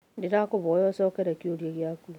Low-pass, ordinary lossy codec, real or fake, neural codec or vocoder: 19.8 kHz; none; real; none